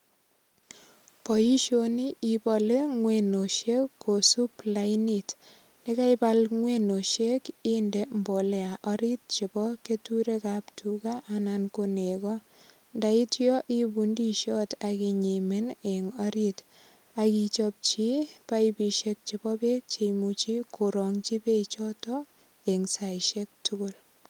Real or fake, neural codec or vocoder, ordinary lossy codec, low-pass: real; none; Opus, 32 kbps; 19.8 kHz